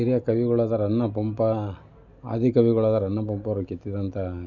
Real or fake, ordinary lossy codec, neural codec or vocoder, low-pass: real; none; none; 7.2 kHz